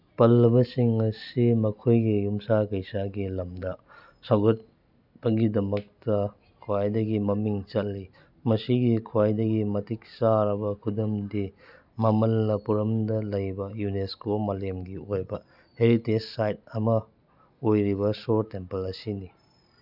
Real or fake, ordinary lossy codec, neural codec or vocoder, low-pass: real; none; none; 5.4 kHz